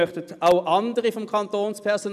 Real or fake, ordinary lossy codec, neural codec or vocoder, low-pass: real; none; none; 14.4 kHz